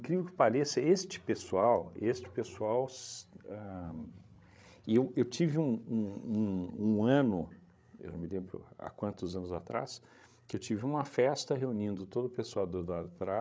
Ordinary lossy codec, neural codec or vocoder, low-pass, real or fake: none; codec, 16 kHz, 16 kbps, FreqCodec, larger model; none; fake